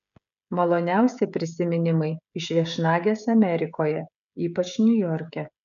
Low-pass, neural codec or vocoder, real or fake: 7.2 kHz; codec, 16 kHz, 16 kbps, FreqCodec, smaller model; fake